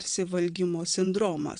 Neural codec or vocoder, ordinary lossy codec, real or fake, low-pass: vocoder, 22.05 kHz, 80 mel bands, WaveNeXt; MP3, 96 kbps; fake; 9.9 kHz